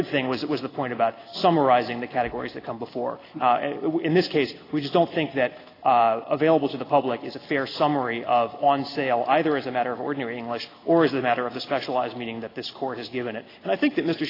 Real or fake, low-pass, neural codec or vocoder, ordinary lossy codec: real; 5.4 kHz; none; AAC, 24 kbps